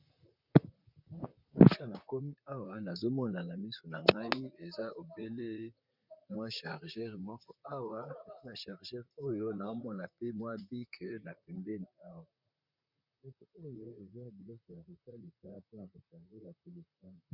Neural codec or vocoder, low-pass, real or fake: vocoder, 44.1 kHz, 128 mel bands, Pupu-Vocoder; 5.4 kHz; fake